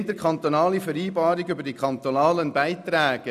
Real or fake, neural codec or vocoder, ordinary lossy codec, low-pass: real; none; none; 14.4 kHz